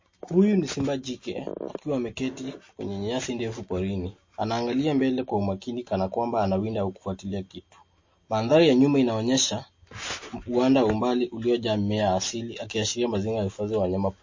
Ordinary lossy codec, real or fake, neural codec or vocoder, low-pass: MP3, 32 kbps; real; none; 7.2 kHz